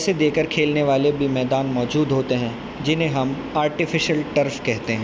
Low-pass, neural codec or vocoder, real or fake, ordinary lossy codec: none; none; real; none